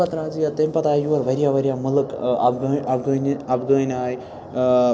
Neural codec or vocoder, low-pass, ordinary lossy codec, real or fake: none; none; none; real